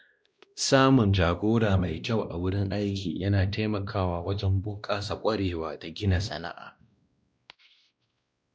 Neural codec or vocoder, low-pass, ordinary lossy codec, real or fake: codec, 16 kHz, 1 kbps, X-Codec, WavLM features, trained on Multilingual LibriSpeech; none; none; fake